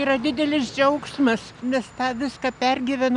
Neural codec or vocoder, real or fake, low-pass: none; real; 10.8 kHz